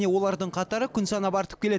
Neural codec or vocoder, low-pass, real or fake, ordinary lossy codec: none; none; real; none